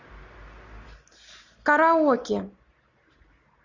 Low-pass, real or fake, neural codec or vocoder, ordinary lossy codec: 7.2 kHz; real; none; Opus, 64 kbps